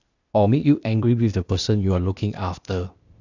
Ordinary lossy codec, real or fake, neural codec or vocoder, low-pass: none; fake; codec, 16 kHz, 0.8 kbps, ZipCodec; 7.2 kHz